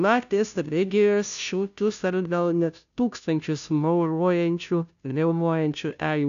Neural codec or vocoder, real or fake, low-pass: codec, 16 kHz, 0.5 kbps, FunCodec, trained on Chinese and English, 25 frames a second; fake; 7.2 kHz